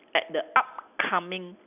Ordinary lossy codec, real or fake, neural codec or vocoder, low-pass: none; real; none; 3.6 kHz